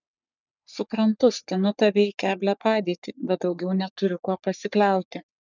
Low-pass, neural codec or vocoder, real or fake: 7.2 kHz; codec, 44.1 kHz, 3.4 kbps, Pupu-Codec; fake